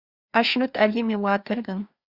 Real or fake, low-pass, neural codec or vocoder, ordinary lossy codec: fake; 5.4 kHz; codec, 16 kHz, 2 kbps, FreqCodec, larger model; Opus, 64 kbps